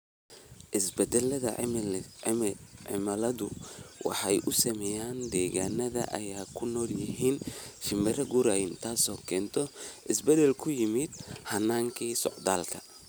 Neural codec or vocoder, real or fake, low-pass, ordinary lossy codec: none; real; none; none